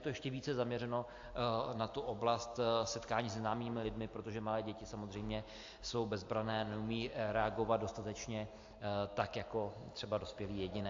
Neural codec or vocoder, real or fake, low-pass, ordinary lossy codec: none; real; 7.2 kHz; AAC, 48 kbps